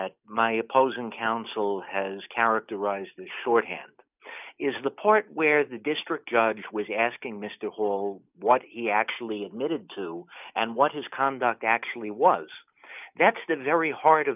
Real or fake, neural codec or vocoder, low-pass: real; none; 3.6 kHz